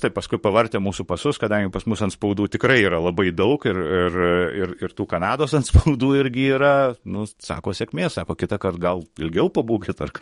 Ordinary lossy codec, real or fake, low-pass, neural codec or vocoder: MP3, 48 kbps; fake; 19.8 kHz; codec, 44.1 kHz, 7.8 kbps, DAC